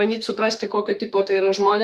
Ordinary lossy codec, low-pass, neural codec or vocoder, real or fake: Opus, 64 kbps; 14.4 kHz; autoencoder, 48 kHz, 32 numbers a frame, DAC-VAE, trained on Japanese speech; fake